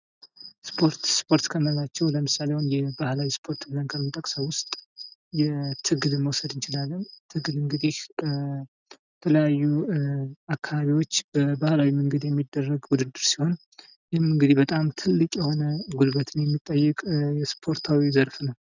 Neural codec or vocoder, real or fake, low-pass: none; real; 7.2 kHz